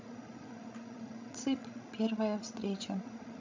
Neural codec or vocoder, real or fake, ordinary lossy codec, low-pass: codec, 16 kHz, 16 kbps, FreqCodec, larger model; fake; MP3, 48 kbps; 7.2 kHz